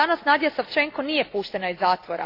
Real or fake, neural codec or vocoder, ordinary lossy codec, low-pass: real; none; AAC, 32 kbps; 5.4 kHz